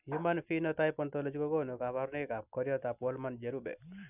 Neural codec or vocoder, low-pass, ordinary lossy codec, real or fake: none; 3.6 kHz; none; real